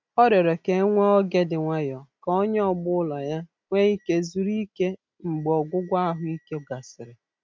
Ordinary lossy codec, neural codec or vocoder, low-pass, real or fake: none; none; none; real